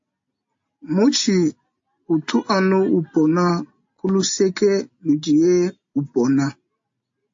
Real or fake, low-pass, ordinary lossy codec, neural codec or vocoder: real; 7.2 kHz; AAC, 48 kbps; none